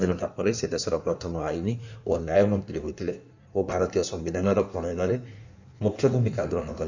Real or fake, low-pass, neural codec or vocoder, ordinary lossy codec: fake; 7.2 kHz; codec, 16 kHz in and 24 kHz out, 1.1 kbps, FireRedTTS-2 codec; none